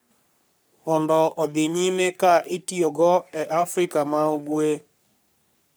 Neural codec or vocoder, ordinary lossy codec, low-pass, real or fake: codec, 44.1 kHz, 3.4 kbps, Pupu-Codec; none; none; fake